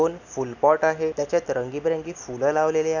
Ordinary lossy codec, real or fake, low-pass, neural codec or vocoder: none; real; 7.2 kHz; none